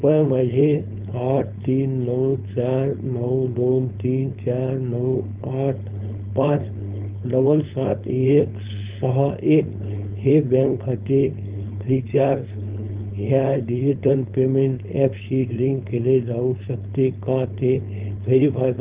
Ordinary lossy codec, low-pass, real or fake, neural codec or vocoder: Opus, 24 kbps; 3.6 kHz; fake; codec, 16 kHz, 4.8 kbps, FACodec